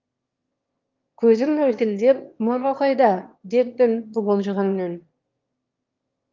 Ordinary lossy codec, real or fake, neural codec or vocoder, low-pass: Opus, 32 kbps; fake; autoencoder, 22.05 kHz, a latent of 192 numbers a frame, VITS, trained on one speaker; 7.2 kHz